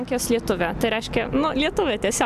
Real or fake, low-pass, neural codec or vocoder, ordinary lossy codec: real; 14.4 kHz; none; AAC, 96 kbps